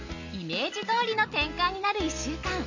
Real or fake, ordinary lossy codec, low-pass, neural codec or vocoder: real; none; 7.2 kHz; none